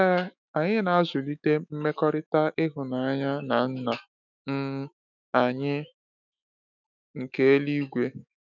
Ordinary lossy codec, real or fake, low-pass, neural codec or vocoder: none; fake; 7.2 kHz; autoencoder, 48 kHz, 128 numbers a frame, DAC-VAE, trained on Japanese speech